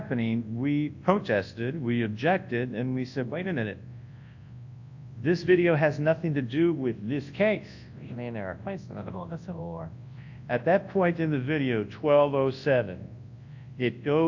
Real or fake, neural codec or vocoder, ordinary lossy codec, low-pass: fake; codec, 24 kHz, 0.9 kbps, WavTokenizer, large speech release; AAC, 48 kbps; 7.2 kHz